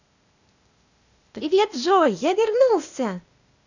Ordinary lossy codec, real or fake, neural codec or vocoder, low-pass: none; fake; codec, 16 kHz, 0.8 kbps, ZipCodec; 7.2 kHz